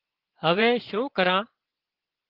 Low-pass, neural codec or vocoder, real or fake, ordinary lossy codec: 5.4 kHz; vocoder, 24 kHz, 100 mel bands, Vocos; fake; Opus, 16 kbps